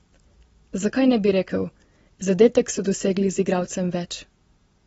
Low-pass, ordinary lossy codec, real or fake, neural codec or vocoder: 19.8 kHz; AAC, 24 kbps; real; none